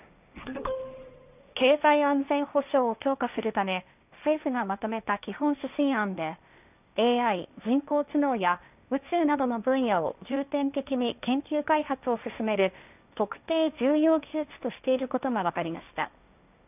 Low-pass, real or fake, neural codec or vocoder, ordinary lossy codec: 3.6 kHz; fake; codec, 16 kHz, 1.1 kbps, Voila-Tokenizer; none